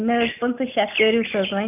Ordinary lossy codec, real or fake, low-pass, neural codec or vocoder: none; real; 3.6 kHz; none